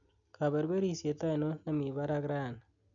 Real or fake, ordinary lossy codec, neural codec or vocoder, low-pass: real; none; none; 7.2 kHz